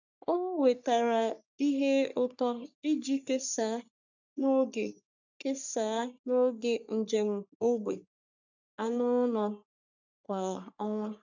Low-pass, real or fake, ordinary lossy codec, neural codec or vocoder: 7.2 kHz; fake; none; codec, 44.1 kHz, 3.4 kbps, Pupu-Codec